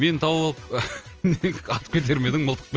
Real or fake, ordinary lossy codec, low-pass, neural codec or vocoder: real; Opus, 24 kbps; 7.2 kHz; none